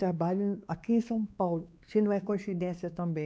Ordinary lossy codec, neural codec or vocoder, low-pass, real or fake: none; codec, 16 kHz, 2 kbps, X-Codec, WavLM features, trained on Multilingual LibriSpeech; none; fake